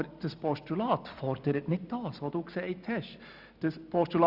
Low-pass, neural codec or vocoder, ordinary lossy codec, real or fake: 5.4 kHz; none; Opus, 64 kbps; real